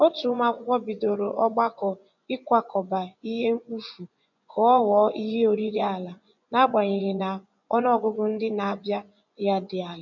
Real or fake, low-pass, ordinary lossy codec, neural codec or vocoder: fake; 7.2 kHz; none; vocoder, 24 kHz, 100 mel bands, Vocos